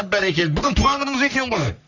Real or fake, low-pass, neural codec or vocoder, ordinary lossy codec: fake; 7.2 kHz; codec, 44.1 kHz, 3.4 kbps, Pupu-Codec; none